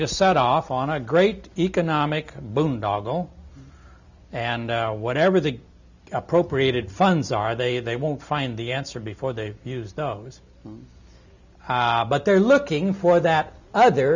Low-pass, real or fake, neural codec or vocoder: 7.2 kHz; real; none